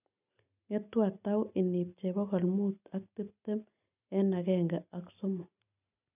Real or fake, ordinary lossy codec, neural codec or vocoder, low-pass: real; none; none; 3.6 kHz